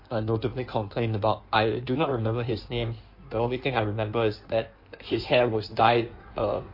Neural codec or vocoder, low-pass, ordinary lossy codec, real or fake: codec, 16 kHz in and 24 kHz out, 1.1 kbps, FireRedTTS-2 codec; 5.4 kHz; MP3, 32 kbps; fake